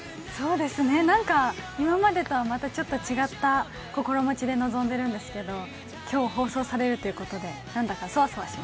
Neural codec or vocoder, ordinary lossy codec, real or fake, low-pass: none; none; real; none